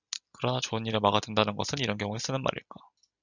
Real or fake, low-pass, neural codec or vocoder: real; 7.2 kHz; none